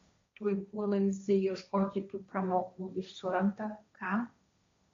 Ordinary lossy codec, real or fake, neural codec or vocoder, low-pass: MP3, 48 kbps; fake; codec, 16 kHz, 1.1 kbps, Voila-Tokenizer; 7.2 kHz